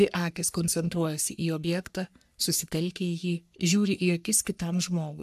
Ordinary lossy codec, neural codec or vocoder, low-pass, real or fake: AAC, 96 kbps; codec, 44.1 kHz, 3.4 kbps, Pupu-Codec; 14.4 kHz; fake